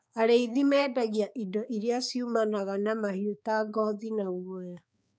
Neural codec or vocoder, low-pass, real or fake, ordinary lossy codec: codec, 16 kHz, 4 kbps, X-Codec, HuBERT features, trained on balanced general audio; none; fake; none